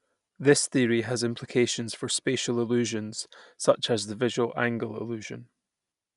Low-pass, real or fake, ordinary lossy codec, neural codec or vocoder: 10.8 kHz; real; none; none